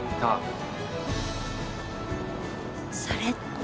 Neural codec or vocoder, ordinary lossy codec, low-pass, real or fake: none; none; none; real